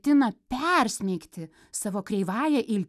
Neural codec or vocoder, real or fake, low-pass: none; real; 14.4 kHz